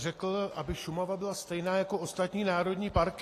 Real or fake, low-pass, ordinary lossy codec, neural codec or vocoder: real; 14.4 kHz; AAC, 48 kbps; none